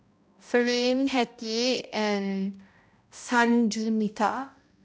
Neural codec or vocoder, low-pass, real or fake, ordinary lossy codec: codec, 16 kHz, 0.5 kbps, X-Codec, HuBERT features, trained on balanced general audio; none; fake; none